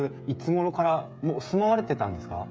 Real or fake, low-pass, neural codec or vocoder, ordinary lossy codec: fake; none; codec, 16 kHz, 16 kbps, FreqCodec, smaller model; none